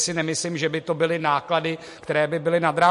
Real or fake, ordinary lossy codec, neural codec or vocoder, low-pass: real; MP3, 48 kbps; none; 14.4 kHz